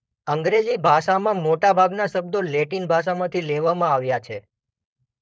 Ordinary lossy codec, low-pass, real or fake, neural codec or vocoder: none; none; fake; codec, 16 kHz, 4.8 kbps, FACodec